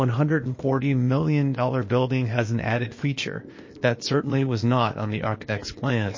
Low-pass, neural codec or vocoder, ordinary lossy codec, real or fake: 7.2 kHz; codec, 16 kHz, 0.8 kbps, ZipCodec; MP3, 32 kbps; fake